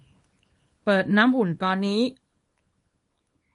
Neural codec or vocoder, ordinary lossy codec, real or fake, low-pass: codec, 24 kHz, 0.9 kbps, WavTokenizer, small release; MP3, 48 kbps; fake; 10.8 kHz